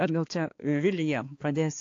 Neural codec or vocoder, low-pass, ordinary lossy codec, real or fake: codec, 16 kHz, 2 kbps, X-Codec, HuBERT features, trained on balanced general audio; 7.2 kHz; AAC, 48 kbps; fake